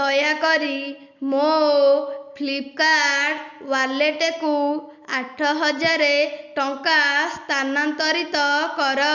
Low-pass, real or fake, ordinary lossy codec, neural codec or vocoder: 7.2 kHz; real; none; none